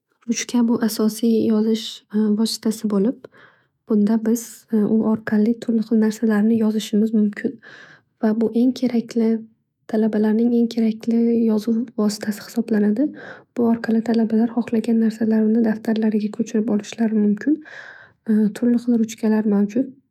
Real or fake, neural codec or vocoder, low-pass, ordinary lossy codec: fake; autoencoder, 48 kHz, 128 numbers a frame, DAC-VAE, trained on Japanese speech; 19.8 kHz; none